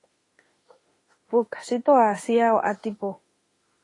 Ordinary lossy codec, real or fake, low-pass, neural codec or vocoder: AAC, 32 kbps; fake; 10.8 kHz; autoencoder, 48 kHz, 32 numbers a frame, DAC-VAE, trained on Japanese speech